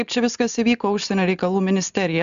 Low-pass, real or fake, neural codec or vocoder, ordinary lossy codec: 7.2 kHz; real; none; AAC, 96 kbps